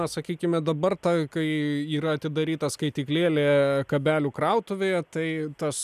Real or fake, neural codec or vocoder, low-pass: real; none; 14.4 kHz